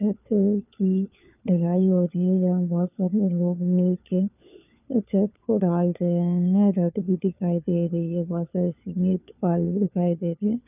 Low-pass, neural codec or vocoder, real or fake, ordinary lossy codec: 3.6 kHz; codec, 16 kHz, 4 kbps, FunCodec, trained on LibriTTS, 50 frames a second; fake; none